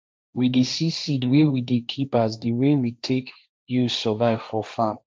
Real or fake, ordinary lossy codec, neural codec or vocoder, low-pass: fake; none; codec, 16 kHz, 1.1 kbps, Voila-Tokenizer; none